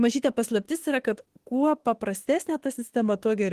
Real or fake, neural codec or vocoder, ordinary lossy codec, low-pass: fake; autoencoder, 48 kHz, 32 numbers a frame, DAC-VAE, trained on Japanese speech; Opus, 16 kbps; 14.4 kHz